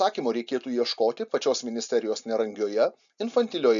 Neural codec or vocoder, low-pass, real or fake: none; 7.2 kHz; real